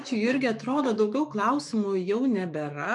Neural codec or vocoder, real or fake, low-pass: autoencoder, 48 kHz, 128 numbers a frame, DAC-VAE, trained on Japanese speech; fake; 10.8 kHz